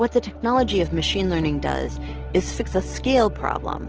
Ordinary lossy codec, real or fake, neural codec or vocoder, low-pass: Opus, 16 kbps; real; none; 7.2 kHz